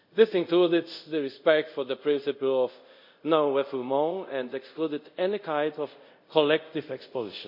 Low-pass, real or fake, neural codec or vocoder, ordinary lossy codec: 5.4 kHz; fake; codec, 24 kHz, 0.5 kbps, DualCodec; none